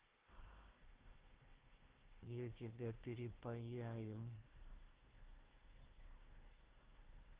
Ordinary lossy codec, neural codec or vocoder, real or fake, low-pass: Opus, 16 kbps; codec, 16 kHz, 1 kbps, FunCodec, trained on Chinese and English, 50 frames a second; fake; 3.6 kHz